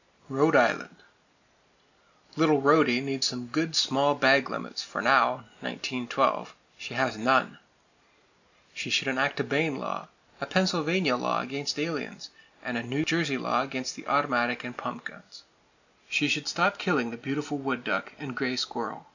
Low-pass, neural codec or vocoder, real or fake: 7.2 kHz; none; real